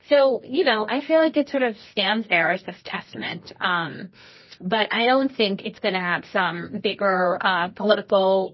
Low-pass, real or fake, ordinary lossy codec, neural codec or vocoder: 7.2 kHz; fake; MP3, 24 kbps; codec, 24 kHz, 0.9 kbps, WavTokenizer, medium music audio release